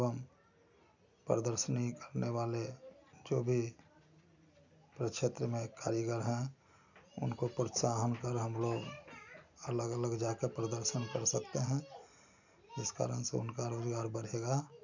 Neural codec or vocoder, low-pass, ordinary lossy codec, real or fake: none; 7.2 kHz; none; real